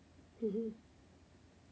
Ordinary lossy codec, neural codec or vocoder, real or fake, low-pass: none; none; real; none